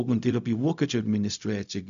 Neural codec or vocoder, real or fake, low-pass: codec, 16 kHz, 0.4 kbps, LongCat-Audio-Codec; fake; 7.2 kHz